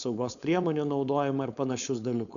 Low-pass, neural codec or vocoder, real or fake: 7.2 kHz; codec, 16 kHz, 4.8 kbps, FACodec; fake